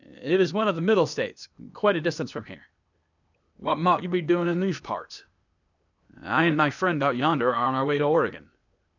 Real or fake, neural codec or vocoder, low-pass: fake; codec, 16 kHz, 0.8 kbps, ZipCodec; 7.2 kHz